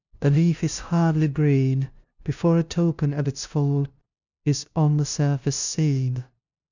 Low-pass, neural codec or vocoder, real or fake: 7.2 kHz; codec, 16 kHz, 0.5 kbps, FunCodec, trained on LibriTTS, 25 frames a second; fake